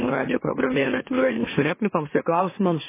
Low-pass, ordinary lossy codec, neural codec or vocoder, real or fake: 3.6 kHz; MP3, 16 kbps; autoencoder, 44.1 kHz, a latent of 192 numbers a frame, MeloTTS; fake